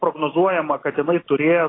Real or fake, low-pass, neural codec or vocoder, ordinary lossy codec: real; 7.2 kHz; none; AAC, 16 kbps